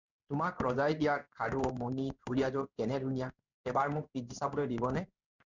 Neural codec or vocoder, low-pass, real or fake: codec, 16 kHz in and 24 kHz out, 1 kbps, XY-Tokenizer; 7.2 kHz; fake